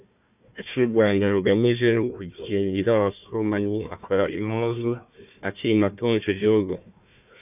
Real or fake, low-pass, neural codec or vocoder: fake; 3.6 kHz; codec, 16 kHz, 1 kbps, FunCodec, trained on Chinese and English, 50 frames a second